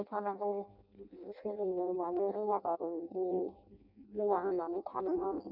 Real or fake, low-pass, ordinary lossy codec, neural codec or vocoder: fake; 5.4 kHz; none; codec, 16 kHz in and 24 kHz out, 0.6 kbps, FireRedTTS-2 codec